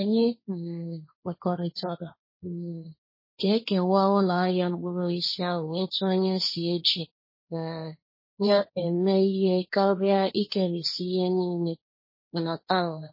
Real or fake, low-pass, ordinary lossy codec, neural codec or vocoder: fake; 5.4 kHz; MP3, 24 kbps; codec, 16 kHz, 1.1 kbps, Voila-Tokenizer